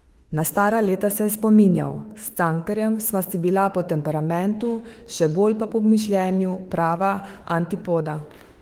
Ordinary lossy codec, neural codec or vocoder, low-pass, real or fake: Opus, 24 kbps; autoencoder, 48 kHz, 32 numbers a frame, DAC-VAE, trained on Japanese speech; 19.8 kHz; fake